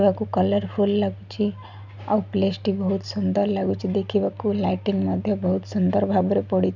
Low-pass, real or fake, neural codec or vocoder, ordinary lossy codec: 7.2 kHz; real; none; none